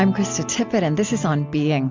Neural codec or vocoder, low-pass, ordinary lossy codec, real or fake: none; 7.2 kHz; MP3, 64 kbps; real